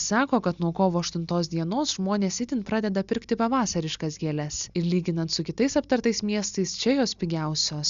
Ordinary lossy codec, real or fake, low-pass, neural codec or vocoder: Opus, 64 kbps; real; 7.2 kHz; none